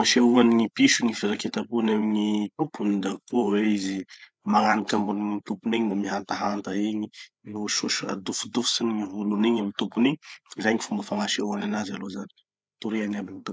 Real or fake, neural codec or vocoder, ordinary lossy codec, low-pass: fake; codec, 16 kHz, 8 kbps, FreqCodec, larger model; none; none